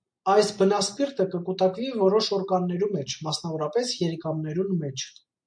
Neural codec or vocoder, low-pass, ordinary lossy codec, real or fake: none; 9.9 kHz; MP3, 48 kbps; real